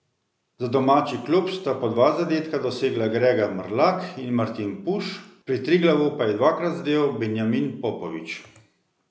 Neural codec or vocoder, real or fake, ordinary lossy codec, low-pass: none; real; none; none